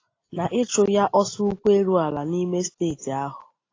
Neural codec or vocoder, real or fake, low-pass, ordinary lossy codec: none; real; 7.2 kHz; AAC, 32 kbps